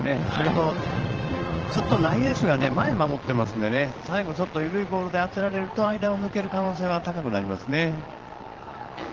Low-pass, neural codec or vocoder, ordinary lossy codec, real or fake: 7.2 kHz; vocoder, 22.05 kHz, 80 mel bands, Vocos; Opus, 16 kbps; fake